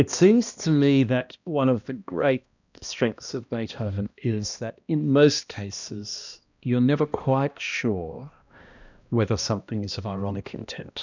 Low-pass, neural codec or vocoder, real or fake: 7.2 kHz; codec, 16 kHz, 1 kbps, X-Codec, HuBERT features, trained on balanced general audio; fake